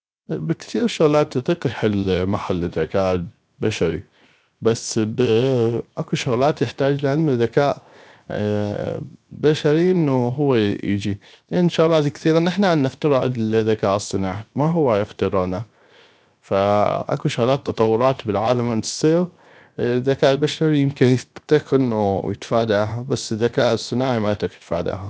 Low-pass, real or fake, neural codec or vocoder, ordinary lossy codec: none; fake; codec, 16 kHz, 0.7 kbps, FocalCodec; none